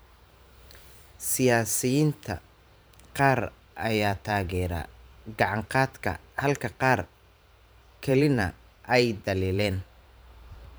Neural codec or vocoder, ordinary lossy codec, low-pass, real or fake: vocoder, 44.1 kHz, 128 mel bands every 512 samples, BigVGAN v2; none; none; fake